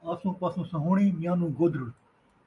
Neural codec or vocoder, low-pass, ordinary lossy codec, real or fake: none; 10.8 kHz; MP3, 96 kbps; real